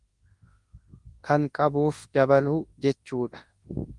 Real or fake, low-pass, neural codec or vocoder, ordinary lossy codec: fake; 10.8 kHz; codec, 24 kHz, 0.9 kbps, WavTokenizer, large speech release; Opus, 24 kbps